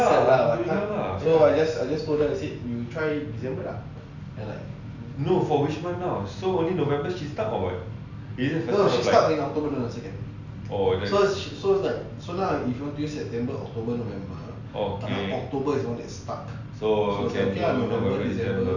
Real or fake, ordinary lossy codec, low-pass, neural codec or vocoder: real; none; 7.2 kHz; none